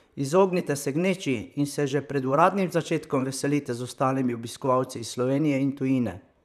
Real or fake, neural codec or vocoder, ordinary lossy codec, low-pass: fake; vocoder, 44.1 kHz, 128 mel bands, Pupu-Vocoder; none; 14.4 kHz